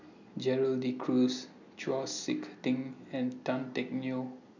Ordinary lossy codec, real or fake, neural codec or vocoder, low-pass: none; real; none; 7.2 kHz